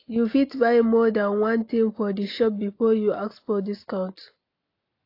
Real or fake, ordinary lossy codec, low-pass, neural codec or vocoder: real; AAC, 32 kbps; 5.4 kHz; none